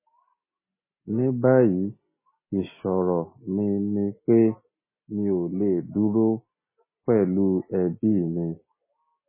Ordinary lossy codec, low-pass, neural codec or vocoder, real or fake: MP3, 16 kbps; 3.6 kHz; none; real